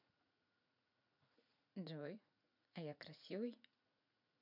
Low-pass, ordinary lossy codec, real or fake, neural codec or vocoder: 5.4 kHz; none; fake; vocoder, 44.1 kHz, 80 mel bands, Vocos